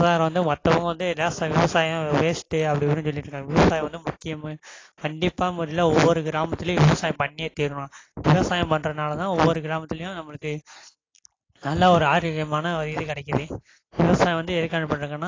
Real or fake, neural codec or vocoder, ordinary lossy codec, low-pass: real; none; AAC, 32 kbps; 7.2 kHz